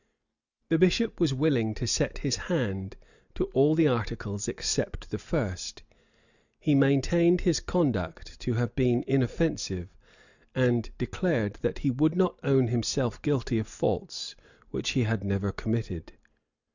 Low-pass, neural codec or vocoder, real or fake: 7.2 kHz; none; real